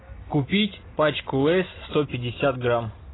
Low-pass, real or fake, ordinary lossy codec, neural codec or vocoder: 7.2 kHz; real; AAC, 16 kbps; none